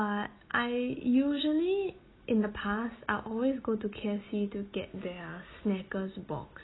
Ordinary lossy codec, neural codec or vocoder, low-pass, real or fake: AAC, 16 kbps; none; 7.2 kHz; real